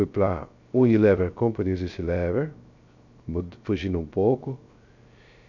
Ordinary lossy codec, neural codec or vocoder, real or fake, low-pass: none; codec, 16 kHz, 0.3 kbps, FocalCodec; fake; 7.2 kHz